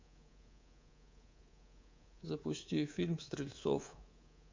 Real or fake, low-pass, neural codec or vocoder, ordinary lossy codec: fake; 7.2 kHz; codec, 24 kHz, 3.1 kbps, DualCodec; MP3, 48 kbps